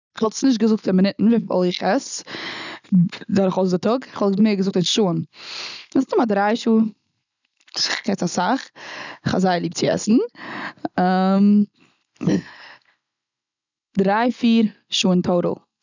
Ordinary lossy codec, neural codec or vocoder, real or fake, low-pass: none; none; real; 7.2 kHz